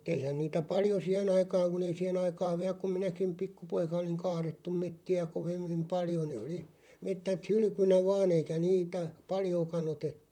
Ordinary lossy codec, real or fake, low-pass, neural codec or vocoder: none; fake; 19.8 kHz; vocoder, 44.1 kHz, 128 mel bands, Pupu-Vocoder